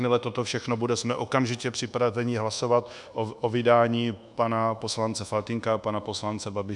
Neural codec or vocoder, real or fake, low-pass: codec, 24 kHz, 1.2 kbps, DualCodec; fake; 10.8 kHz